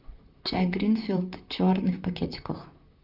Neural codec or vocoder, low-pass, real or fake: vocoder, 44.1 kHz, 128 mel bands, Pupu-Vocoder; 5.4 kHz; fake